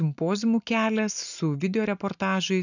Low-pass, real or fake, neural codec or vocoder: 7.2 kHz; real; none